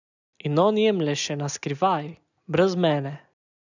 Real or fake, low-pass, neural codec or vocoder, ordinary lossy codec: real; 7.2 kHz; none; none